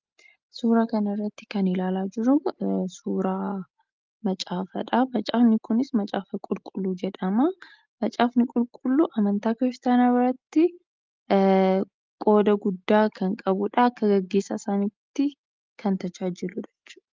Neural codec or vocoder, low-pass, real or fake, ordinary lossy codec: none; 7.2 kHz; real; Opus, 32 kbps